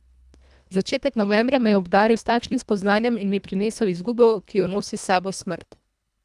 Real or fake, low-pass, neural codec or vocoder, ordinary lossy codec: fake; none; codec, 24 kHz, 1.5 kbps, HILCodec; none